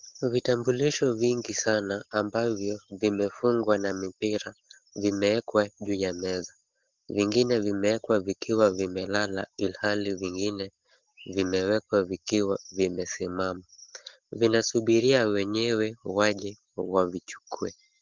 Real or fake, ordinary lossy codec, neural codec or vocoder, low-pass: real; Opus, 16 kbps; none; 7.2 kHz